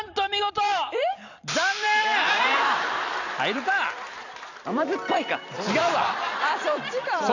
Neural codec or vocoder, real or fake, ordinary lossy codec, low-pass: vocoder, 44.1 kHz, 128 mel bands every 256 samples, BigVGAN v2; fake; none; 7.2 kHz